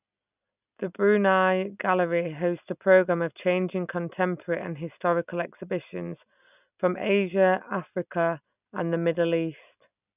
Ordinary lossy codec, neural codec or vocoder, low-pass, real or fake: none; none; 3.6 kHz; real